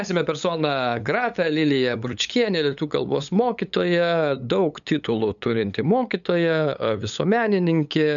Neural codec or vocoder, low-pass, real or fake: codec, 16 kHz, 8 kbps, FunCodec, trained on LibriTTS, 25 frames a second; 7.2 kHz; fake